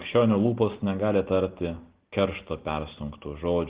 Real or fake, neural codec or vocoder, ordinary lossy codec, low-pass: real; none; Opus, 16 kbps; 3.6 kHz